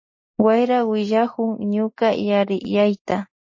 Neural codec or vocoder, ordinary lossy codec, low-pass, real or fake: none; MP3, 32 kbps; 7.2 kHz; real